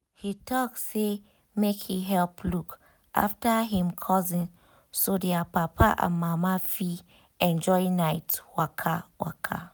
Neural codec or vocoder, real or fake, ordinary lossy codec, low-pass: none; real; none; none